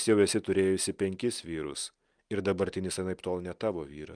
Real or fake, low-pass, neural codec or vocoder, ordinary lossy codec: real; 9.9 kHz; none; Opus, 32 kbps